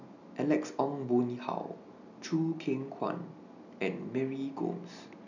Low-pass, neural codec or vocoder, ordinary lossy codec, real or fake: 7.2 kHz; none; none; real